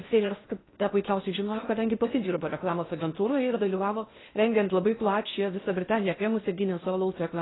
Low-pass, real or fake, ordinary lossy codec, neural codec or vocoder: 7.2 kHz; fake; AAC, 16 kbps; codec, 16 kHz in and 24 kHz out, 0.6 kbps, FocalCodec, streaming, 2048 codes